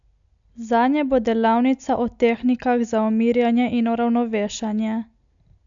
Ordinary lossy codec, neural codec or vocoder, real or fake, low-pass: MP3, 64 kbps; none; real; 7.2 kHz